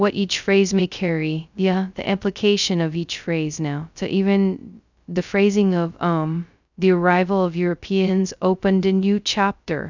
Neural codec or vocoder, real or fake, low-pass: codec, 16 kHz, 0.2 kbps, FocalCodec; fake; 7.2 kHz